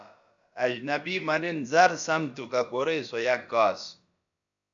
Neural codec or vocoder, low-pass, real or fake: codec, 16 kHz, about 1 kbps, DyCAST, with the encoder's durations; 7.2 kHz; fake